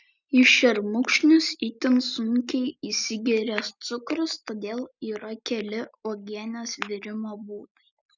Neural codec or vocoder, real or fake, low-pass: none; real; 7.2 kHz